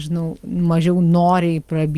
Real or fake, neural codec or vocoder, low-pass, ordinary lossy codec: real; none; 14.4 kHz; Opus, 32 kbps